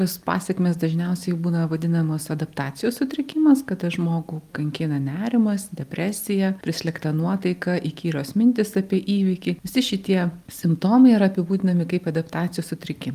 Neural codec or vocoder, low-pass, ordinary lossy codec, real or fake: none; 14.4 kHz; Opus, 32 kbps; real